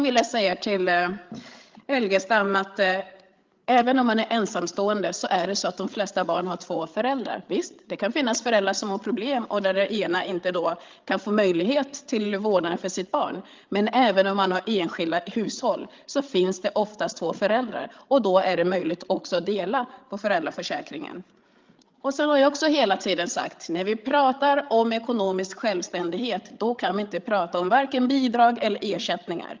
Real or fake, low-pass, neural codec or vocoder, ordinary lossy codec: fake; 7.2 kHz; codec, 16 kHz, 8 kbps, FreqCodec, larger model; Opus, 32 kbps